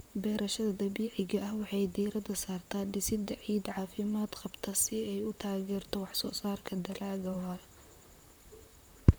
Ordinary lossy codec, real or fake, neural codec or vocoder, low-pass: none; fake; vocoder, 44.1 kHz, 128 mel bands, Pupu-Vocoder; none